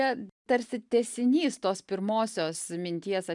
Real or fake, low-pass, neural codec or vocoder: real; 10.8 kHz; none